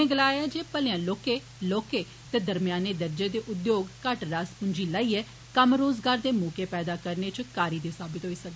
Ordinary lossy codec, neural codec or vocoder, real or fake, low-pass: none; none; real; none